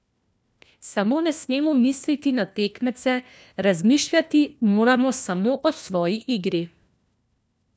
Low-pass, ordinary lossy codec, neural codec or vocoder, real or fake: none; none; codec, 16 kHz, 1 kbps, FunCodec, trained on LibriTTS, 50 frames a second; fake